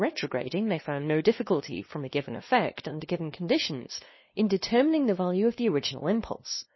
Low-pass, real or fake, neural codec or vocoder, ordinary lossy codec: 7.2 kHz; fake; codec, 16 kHz, 2 kbps, FunCodec, trained on LibriTTS, 25 frames a second; MP3, 24 kbps